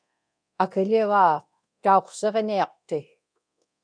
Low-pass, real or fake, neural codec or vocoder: 9.9 kHz; fake; codec, 24 kHz, 0.9 kbps, DualCodec